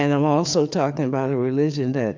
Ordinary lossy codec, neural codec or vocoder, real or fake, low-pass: MP3, 64 kbps; codec, 16 kHz, 4 kbps, FreqCodec, larger model; fake; 7.2 kHz